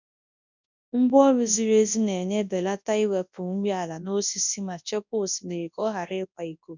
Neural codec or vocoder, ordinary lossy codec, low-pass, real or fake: codec, 24 kHz, 0.9 kbps, WavTokenizer, large speech release; none; 7.2 kHz; fake